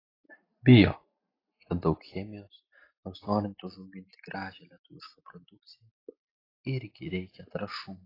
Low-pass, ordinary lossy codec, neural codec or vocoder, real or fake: 5.4 kHz; AAC, 24 kbps; none; real